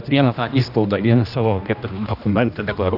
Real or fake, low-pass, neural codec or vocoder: fake; 5.4 kHz; codec, 16 kHz, 1 kbps, X-Codec, HuBERT features, trained on general audio